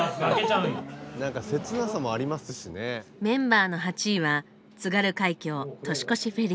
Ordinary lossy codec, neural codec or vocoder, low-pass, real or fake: none; none; none; real